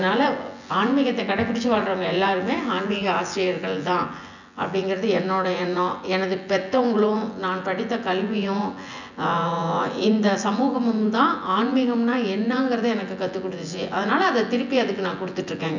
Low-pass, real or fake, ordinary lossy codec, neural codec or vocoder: 7.2 kHz; fake; none; vocoder, 24 kHz, 100 mel bands, Vocos